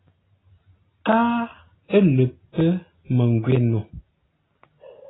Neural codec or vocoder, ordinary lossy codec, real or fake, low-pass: none; AAC, 16 kbps; real; 7.2 kHz